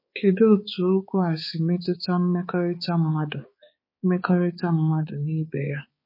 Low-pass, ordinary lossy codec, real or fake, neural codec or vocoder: 5.4 kHz; MP3, 24 kbps; fake; codec, 16 kHz, 4 kbps, X-Codec, HuBERT features, trained on balanced general audio